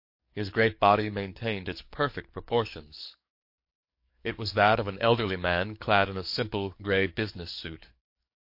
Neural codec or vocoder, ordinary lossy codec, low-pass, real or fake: codec, 44.1 kHz, 7.8 kbps, DAC; MP3, 32 kbps; 5.4 kHz; fake